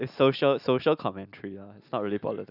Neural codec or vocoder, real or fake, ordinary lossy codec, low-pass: none; real; none; 5.4 kHz